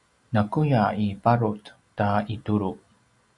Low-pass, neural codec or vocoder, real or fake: 10.8 kHz; none; real